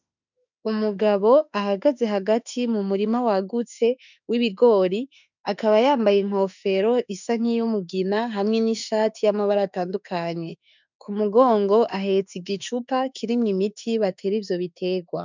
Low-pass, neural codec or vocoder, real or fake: 7.2 kHz; autoencoder, 48 kHz, 32 numbers a frame, DAC-VAE, trained on Japanese speech; fake